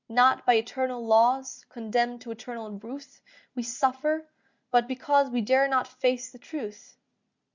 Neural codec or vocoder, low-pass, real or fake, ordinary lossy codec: none; 7.2 kHz; real; Opus, 64 kbps